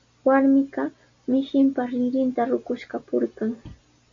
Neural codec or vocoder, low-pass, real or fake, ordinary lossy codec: none; 7.2 kHz; real; MP3, 48 kbps